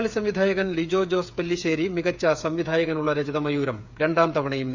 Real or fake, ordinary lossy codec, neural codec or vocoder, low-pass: fake; none; codec, 16 kHz, 8 kbps, FreqCodec, smaller model; 7.2 kHz